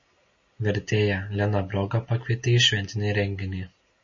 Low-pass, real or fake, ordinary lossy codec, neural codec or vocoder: 7.2 kHz; real; MP3, 32 kbps; none